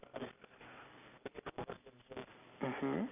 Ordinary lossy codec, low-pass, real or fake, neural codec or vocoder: none; 3.6 kHz; real; none